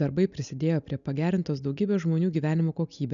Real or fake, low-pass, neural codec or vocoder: real; 7.2 kHz; none